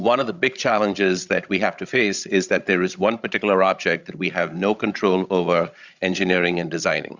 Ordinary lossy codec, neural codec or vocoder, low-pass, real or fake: Opus, 64 kbps; codec, 16 kHz, 16 kbps, FreqCodec, larger model; 7.2 kHz; fake